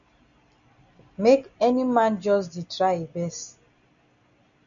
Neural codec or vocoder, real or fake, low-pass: none; real; 7.2 kHz